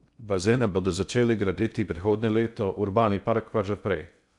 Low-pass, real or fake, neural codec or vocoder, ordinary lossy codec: 10.8 kHz; fake; codec, 16 kHz in and 24 kHz out, 0.6 kbps, FocalCodec, streaming, 2048 codes; none